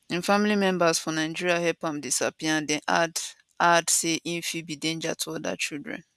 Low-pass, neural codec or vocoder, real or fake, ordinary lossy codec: none; none; real; none